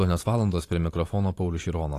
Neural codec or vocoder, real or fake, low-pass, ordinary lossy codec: vocoder, 44.1 kHz, 128 mel bands every 512 samples, BigVGAN v2; fake; 14.4 kHz; AAC, 48 kbps